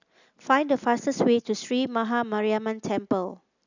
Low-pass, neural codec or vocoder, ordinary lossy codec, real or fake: 7.2 kHz; none; none; real